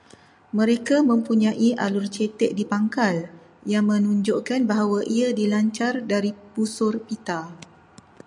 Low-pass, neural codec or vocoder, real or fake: 10.8 kHz; none; real